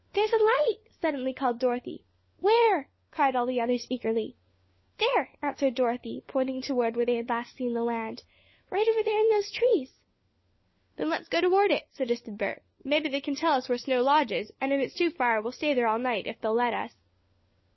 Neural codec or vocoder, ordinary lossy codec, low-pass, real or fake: codec, 16 kHz, 4 kbps, FunCodec, trained on LibriTTS, 50 frames a second; MP3, 24 kbps; 7.2 kHz; fake